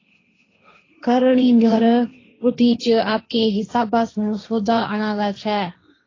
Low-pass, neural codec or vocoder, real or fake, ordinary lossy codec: 7.2 kHz; codec, 16 kHz, 1.1 kbps, Voila-Tokenizer; fake; AAC, 32 kbps